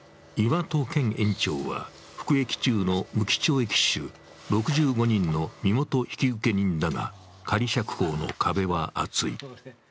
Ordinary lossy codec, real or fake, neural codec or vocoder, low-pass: none; real; none; none